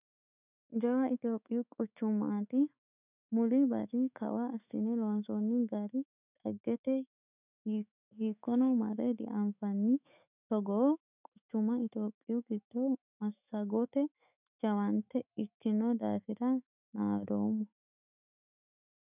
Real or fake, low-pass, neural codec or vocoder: fake; 3.6 kHz; autoencoder, 48 kHz, 128 numbers a frame, DAC-VAE, trained on Japanese speech